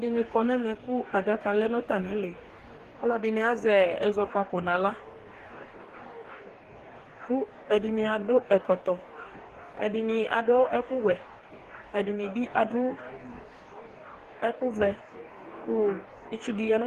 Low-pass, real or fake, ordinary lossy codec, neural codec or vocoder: 14.4 kHz; fake; Opus, 16 kbps; codec, 44.1 kHz, 2.6 kbps, DAC